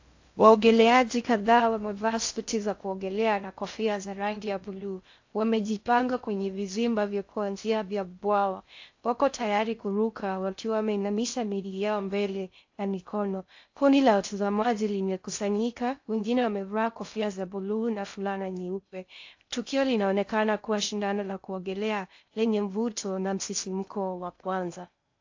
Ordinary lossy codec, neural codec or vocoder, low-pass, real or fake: AAC, 48 kbps; codec, 16 kHz in and 24 kHz out, 0.6 kbps, FocalCodec, streaming, 2048 codes; 7.2 kHz; fake